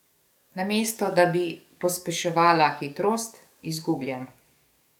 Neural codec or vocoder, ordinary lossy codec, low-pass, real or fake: codec, 44.1 kHz, 7.8 kbps, DAC; none; 19.8 kHz; fake